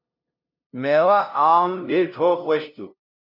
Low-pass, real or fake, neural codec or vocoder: 5.4 kHz; fake; codec, 16 kHz, 0.5 kbps, FunCodec, trained on LibriTTS, 25 frames a second